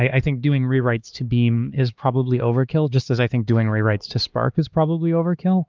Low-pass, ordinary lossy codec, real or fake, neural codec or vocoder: 7.2 kHz; Opus, 24 kbps; fake; codec, 16 kHz, 2 kbps, X-Codec, HuBERT features, trained on LibriSpeech